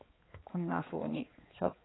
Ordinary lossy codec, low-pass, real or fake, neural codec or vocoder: AAC, 16 kbps; 7.2 kHz; fake; codec, 16 kHz, 4 kbps, X-Codec, HuBERT features, trained on balanced general audio